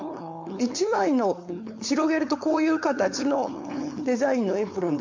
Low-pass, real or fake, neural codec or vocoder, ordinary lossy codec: 7.2 kHz; fake; codec, 16 kHz, 4.8 kbps, FACodec; MP3, 48 kbps